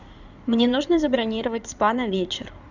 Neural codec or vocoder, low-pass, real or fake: codec, 16 kHz in and 24 kHz out, 2.2 kbps, FireRedTTS-2 codec; 7.2 kHz; fake